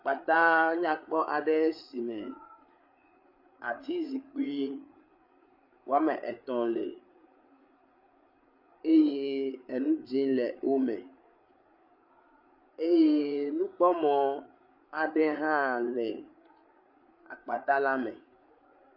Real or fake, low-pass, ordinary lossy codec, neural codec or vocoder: fake; 5.4 kHz; MP3, 48 kbps; codec, 16 kHz, 8 kbps, FreqCodec, larger model